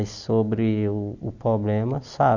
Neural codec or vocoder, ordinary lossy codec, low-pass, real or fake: none; none; 7.2 kHz; real